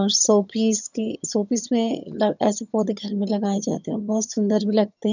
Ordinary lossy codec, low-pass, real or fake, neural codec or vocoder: none; 7.2 kHz; fake; vocoder, 22.05 kHz, 80 mel bands, HiFi-GAN